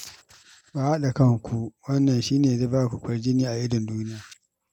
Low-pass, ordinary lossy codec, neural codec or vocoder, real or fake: 19.8 kHz; none; none; real